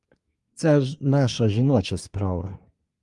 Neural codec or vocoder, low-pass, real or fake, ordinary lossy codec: codec, 24 kHz, 1 kbps, SNAC; 10.8 kHz; fake; Opus, 32 kbps